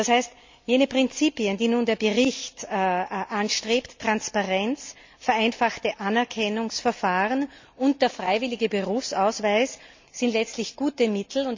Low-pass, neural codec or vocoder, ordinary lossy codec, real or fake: 7.2 kHz; none; MP3, 64 kbps; real